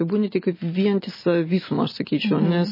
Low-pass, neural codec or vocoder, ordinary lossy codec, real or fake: 5.4 kHz; none; MP3, 24 kbps; real